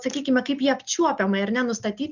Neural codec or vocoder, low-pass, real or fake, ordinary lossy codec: none; 7.2 kHz; real; Opus, 64 kbps